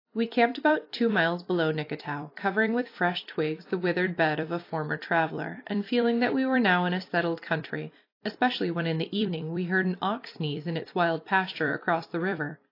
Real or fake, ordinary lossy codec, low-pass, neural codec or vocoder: fake; AAC, 32 kbps; 5.4 kHz; vocoder, 44.1 kHz, 128 mel bands every 256 samples, BigVGAN v2